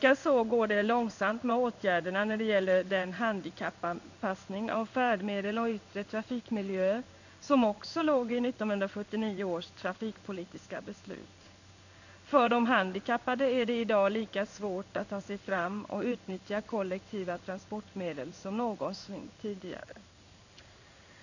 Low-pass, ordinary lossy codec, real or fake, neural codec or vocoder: 7.2 kHz; none; fake; codec, 16 kHz in and 24 kHz out, 1 kbps, XY-Tokenizer